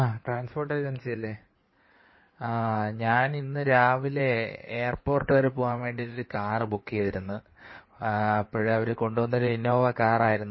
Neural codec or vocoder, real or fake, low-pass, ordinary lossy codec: codec, 16 kHz in and 24 kHz out, 2.2 kbps, FireRedTTS-2 codec; fake; 7.2 kHz; MP3, 24 kbps